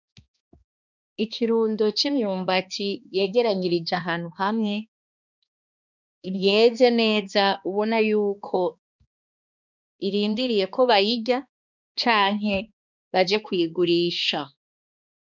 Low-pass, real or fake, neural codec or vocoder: 7.2 kHz; fake; codec, 16 kHz, 2 kbps, X-Codec, HuBERT features, trained on balanced general audio